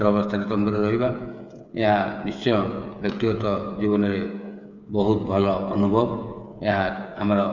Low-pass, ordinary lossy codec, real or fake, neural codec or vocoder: 7.2 kHz; none; fake; codec, 16 kHz, 8 kbps, FreqCodec, smaller model